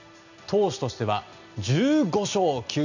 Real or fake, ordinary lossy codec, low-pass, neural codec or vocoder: real; none; 7.2 kHz; none